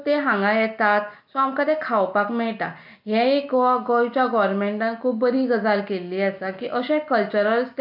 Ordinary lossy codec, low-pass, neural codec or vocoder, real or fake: MP3, 48 kbps; 5.4 kHz; none; real